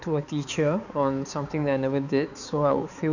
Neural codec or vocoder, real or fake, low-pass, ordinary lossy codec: codec, 16 kHz, 4 kbps, X-Codec, HuBERT features, trained on balanced general audio; fake; 7.2 kHz; none